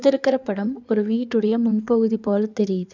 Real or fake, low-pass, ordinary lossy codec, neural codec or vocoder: fake; 7.2 kHz; none; codec, 16 kHz in and 24 kHz out, 2.2 kbps, FireRedTTS-2 codec